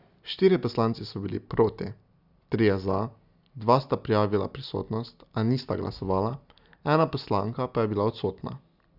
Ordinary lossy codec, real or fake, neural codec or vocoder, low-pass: none; real; none; 5.4 kHz